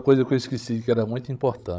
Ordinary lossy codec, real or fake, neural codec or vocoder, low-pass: none; fake; codec, 16 kHz, 16 kbps, FreqCodec, larger model; none